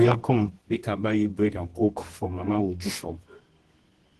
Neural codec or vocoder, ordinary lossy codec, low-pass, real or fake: codec, 24 kHz, 0.9 kbps, WavTokenizer, medium music audio release; Opus, 16 kbps; 10.8 kHz; fake